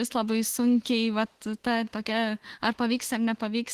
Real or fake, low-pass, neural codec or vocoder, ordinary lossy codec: fake; 14.4 kHz; autoencoder, 48 kHz, 32 numbers a frame, DAC-VAE, trained on Japanese speech; Opus, 16 kbps